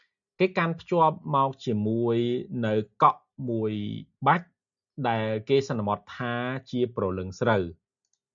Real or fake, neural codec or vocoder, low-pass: real; none; 7.2 kHz